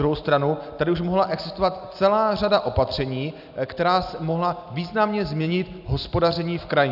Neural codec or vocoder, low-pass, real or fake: none; 5.4 kHz; real